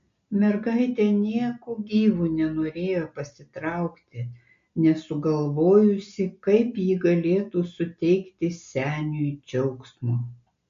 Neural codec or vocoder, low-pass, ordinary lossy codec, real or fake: none; 7.2 kHz; MP3, 48 kbps; real